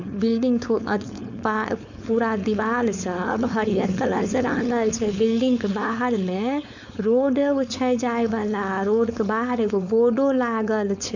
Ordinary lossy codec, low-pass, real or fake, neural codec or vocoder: none; 7.2 kHz; fake; codec, 16 kHz, 4.8 kbps, FACodec